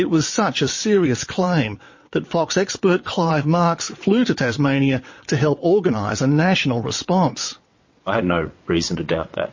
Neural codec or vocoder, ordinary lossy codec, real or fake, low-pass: vocoder, 22.05 kHz, 80 mel bands, WaveNeXt; MP3, 32 kbps; fake; 7.2 kHz